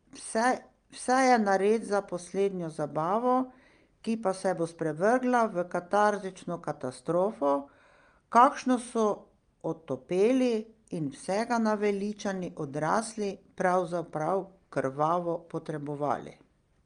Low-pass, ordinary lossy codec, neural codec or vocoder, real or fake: 9.9 kHz; Opus, 32 kbps; none; real